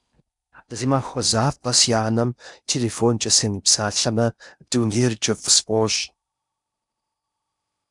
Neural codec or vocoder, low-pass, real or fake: codec, 16 kHz in and 24 kHz out, 0.6 kbps, FocalCodec, streaming, 4096 codes; 10.8 kHz; fake